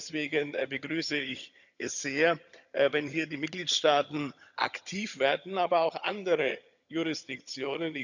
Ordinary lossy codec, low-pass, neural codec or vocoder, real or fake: none; 7.2 kHz; vocoder, 22.05 kHz, 80 mel bands, HiFi-GAN; fake